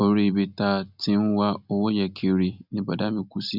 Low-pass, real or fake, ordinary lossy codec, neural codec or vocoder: 5.4 kHz; real; none; none